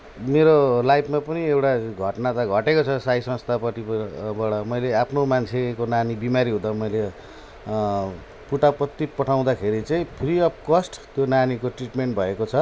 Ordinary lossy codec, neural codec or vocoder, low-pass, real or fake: none; none; none; real